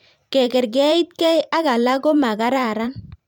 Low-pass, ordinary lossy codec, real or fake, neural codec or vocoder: 19.8 kHz; none; fake; vocoder, 44.1 kHz, 128 mel bands every 512 samples, BigVGAN v2